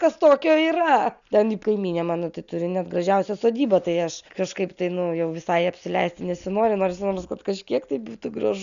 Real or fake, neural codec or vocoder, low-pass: real; none; 7.2 kHz